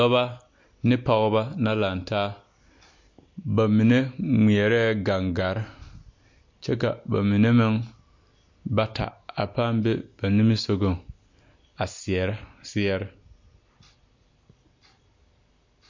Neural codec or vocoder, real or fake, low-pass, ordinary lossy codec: none; real; 7.2 kHz; MP3, 48 kbps